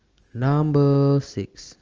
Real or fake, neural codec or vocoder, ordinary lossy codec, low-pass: real; none; Opus, 24 kbps; 7.2 kHz